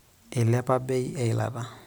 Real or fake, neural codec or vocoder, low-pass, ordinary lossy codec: real; none; none; none